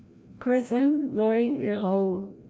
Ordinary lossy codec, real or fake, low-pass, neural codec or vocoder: none; fake; none; codec, 16 kHz, 0.5 kbps, FreqCodec, larger model